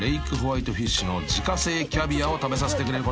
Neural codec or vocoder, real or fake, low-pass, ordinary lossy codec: none; real; none; none